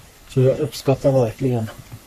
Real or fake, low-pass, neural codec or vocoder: fake; 14.4 kHz; codec, 44.1 kHz, 3.4 kbps, Pupu-Codec